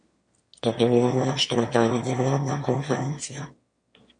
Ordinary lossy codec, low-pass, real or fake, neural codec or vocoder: MP3, 48 kbps; 9.9 kHz; fake; autoencoder, 22.05 kHz, a latent of 192 numbers a frame, VITS, trained on one speaker